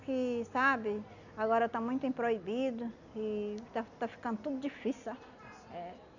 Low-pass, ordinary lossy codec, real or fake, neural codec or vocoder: 7.2 kHz; none; real; none